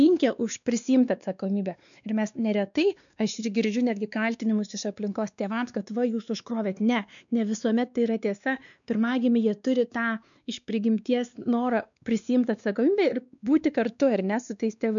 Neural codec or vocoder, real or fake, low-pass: codec, 16 kHz, 2 kbps, X-Codec, WavLM features, trained on Multilingual LibriSpeech; fake; 7.2 kHz